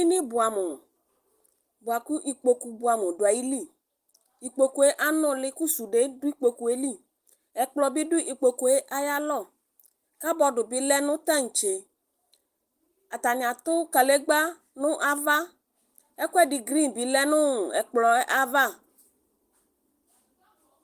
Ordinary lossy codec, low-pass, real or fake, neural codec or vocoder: Opus, 24 kbps; 14.4 kHz; real; none